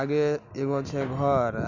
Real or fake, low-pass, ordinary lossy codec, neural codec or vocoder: real; 7.2 kHz; Opus, 64 kbps; none